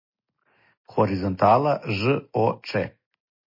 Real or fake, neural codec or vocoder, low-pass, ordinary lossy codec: real; none; 5.4 kHz; MP3, 24 kbps